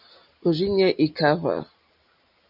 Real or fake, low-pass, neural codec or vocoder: real; 5.4 kHz; none